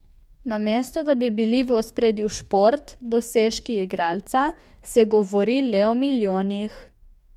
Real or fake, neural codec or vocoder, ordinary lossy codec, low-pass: fake; codec, 44.1 kHz, 2.6 kbps, DAC; MP3, 96 kbps; 19.8 kHz